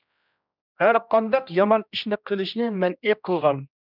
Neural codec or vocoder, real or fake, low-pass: codec, 16 kHz, 1 kbps, X-Codec, HuBERT features, trained on general audio; fake; 5.4 kHz